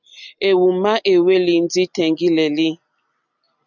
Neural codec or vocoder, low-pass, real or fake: none; 7.2 kHz; real